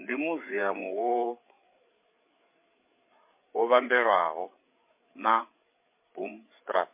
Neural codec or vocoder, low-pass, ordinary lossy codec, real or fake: codec, 16 kHz, 8 kbps, FreqCodec, larger model; 3.6 kHz; MP3, 24 kbps; fake